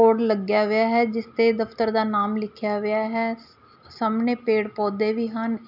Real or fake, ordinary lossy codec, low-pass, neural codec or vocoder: real; none; 5.4 kHz; none